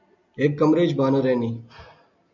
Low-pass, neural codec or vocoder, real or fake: 7.2 kHz; none; real